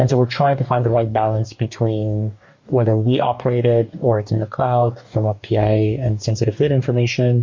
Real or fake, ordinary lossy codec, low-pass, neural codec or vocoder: fake; MP3, 48 kbps; 7.2 kHz; codec, 44.1 kHz, 2.6 kbps, DAC